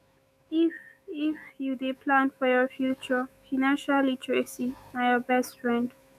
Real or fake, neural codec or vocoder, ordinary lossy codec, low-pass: fake; autoencoder, 48 kHz, 128 numbers a frame, DAC-VAE, trained on Japanese speech; none; 14.4 kHz